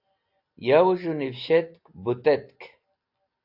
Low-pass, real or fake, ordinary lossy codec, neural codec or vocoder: 5.4 kHz; real; AAC, 48 kbps; none